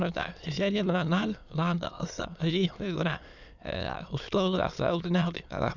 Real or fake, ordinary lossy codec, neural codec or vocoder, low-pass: fake; none; autoencoder, 22.05 kHz, a latent of 192 numbers a frame, VITS, trained on many speakers; 7.2 kHz